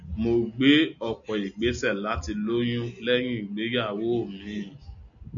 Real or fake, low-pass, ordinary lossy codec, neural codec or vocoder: real; 7.2 kHz; MP3, 96 kbps; none